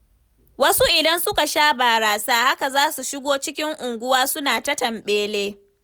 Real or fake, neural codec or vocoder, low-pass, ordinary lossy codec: real; none; none; none